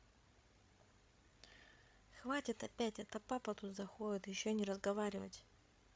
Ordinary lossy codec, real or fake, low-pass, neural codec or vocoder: none; fake; none; codec, 16 kHz, 16 kbps, FreqCodec, larger model